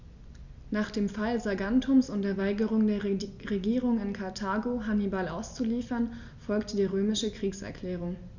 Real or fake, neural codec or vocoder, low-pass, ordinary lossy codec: real; none; 7.2 kHz; none